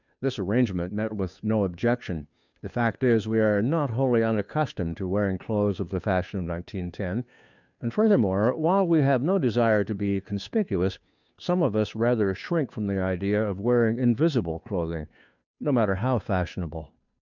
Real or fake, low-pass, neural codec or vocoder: fake; 7.2 kHz; codec, 16 kHz, 2 kbps, FunCodec, trained on Chinese and English, 25 frames a second